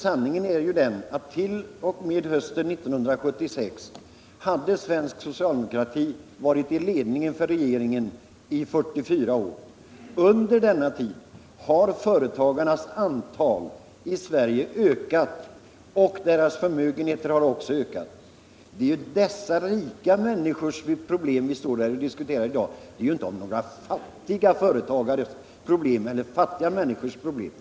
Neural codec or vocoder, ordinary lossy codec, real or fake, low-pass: none; none; real; none